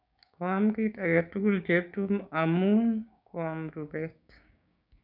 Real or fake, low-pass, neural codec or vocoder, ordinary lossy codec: fake; 5.4 kHz; codec, 16 kHz, 6 kbps, DAC; none